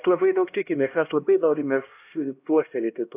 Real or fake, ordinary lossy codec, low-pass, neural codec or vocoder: fake; AAC, 32 kbps; 3.6 kHz; codec, 16 kHz, 1 kbps, X-Codec, HuBERT features, trained on LibriSpeech